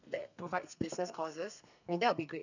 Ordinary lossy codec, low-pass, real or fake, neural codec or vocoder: none; 7.2 kHz; fake; codec, 32 kHz, 1.9 kbps, SNAC